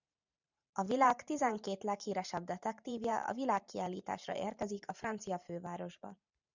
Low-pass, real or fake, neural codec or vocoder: 7.2 kHz; fake; vocoder, 44.1 kHz, 128 mel bands every 512 samples, BigVGAN v2